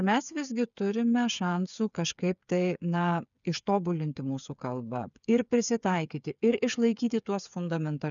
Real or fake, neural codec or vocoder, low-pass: fake; codec, 16 kHz, 8 kbps, FreqCodec, smaller model; 7.2 kHz